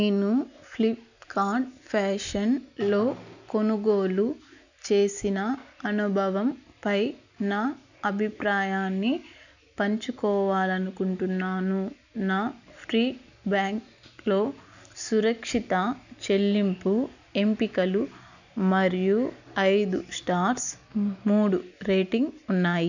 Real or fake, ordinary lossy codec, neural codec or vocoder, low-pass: real; none; none; 7.2 kHz